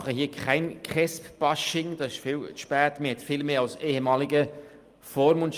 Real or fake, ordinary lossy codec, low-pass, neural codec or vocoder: real; Opus, 32 kbps; 14.4 kHz; none